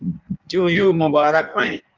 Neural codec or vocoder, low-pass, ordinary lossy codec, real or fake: codec, 16 kHz in and 24 kHz out, 1.1 kbps, FireRedTTS-2 codec; 7.2 kHz; Opus, 24 kbps; fake